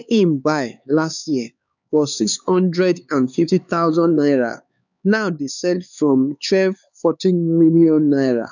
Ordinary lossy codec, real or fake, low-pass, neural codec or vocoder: none; fake; 7.2 kHz; codec, 16 kHz, 4 kbps, X-Codec, HuBERT features, trained on LibriSpeech